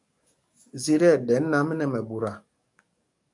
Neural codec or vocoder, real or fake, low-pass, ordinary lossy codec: codec, 44.1 kHz, 7.8 kbps, DAC; fake; 10.8 kHz; MP3, 96 kbps